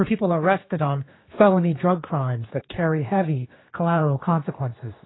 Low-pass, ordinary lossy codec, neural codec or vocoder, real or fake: 7.2 kHz; AAC, 16 kbps; codec, 16 kHz, 2 kbps, X-Codec, HuBERT features, trained on general audio; fake